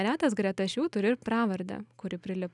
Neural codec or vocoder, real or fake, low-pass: none; real; 10.8 kHz